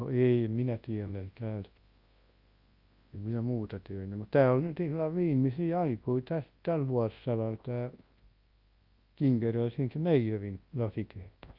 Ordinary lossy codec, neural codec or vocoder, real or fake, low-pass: Opus, 32 kbps; codec, 24 kHz, 0.9 kbps, WavTokenizer, large speech release; fake; 5.4 kHz